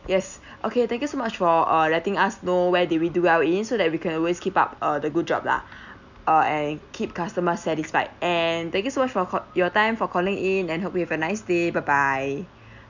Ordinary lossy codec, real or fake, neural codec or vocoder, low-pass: none; real; none; 7.2 kHz